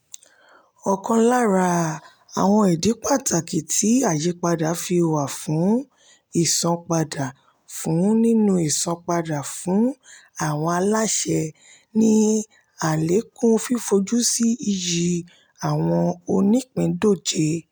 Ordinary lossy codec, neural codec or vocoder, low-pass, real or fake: none; none; none; real